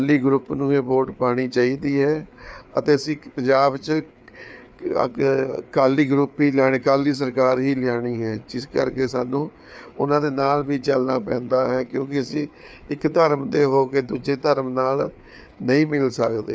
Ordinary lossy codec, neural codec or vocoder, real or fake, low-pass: none; codec, 16 kHz, 4 kbps, FreqCodec, larger model; fake; none